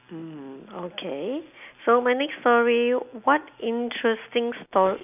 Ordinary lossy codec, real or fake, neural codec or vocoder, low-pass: none; real; none; 3.6 kHz